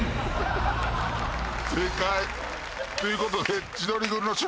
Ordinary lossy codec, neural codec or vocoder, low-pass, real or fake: none; none; none; real